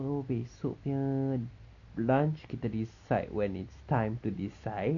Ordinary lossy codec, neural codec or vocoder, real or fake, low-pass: none; none; real; 7.2 kHz